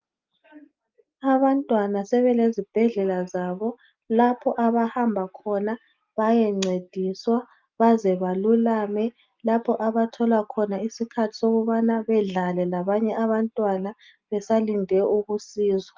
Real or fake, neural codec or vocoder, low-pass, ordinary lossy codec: real; none; 7.2 kHz; Opus, 24 kbps